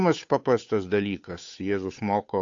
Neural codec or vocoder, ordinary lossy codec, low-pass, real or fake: codec, 16 kHz, 8 kbps, FunCodec, trained on Chinese and English, 25 frames a second; AAC, 48 kbps; 7.2 kHz; fake